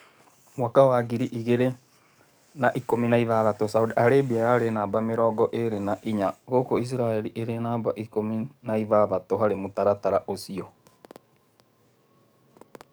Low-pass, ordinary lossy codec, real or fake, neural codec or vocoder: none; none; fake; codec, 44.1 kHz, 7.8 kbps, DAC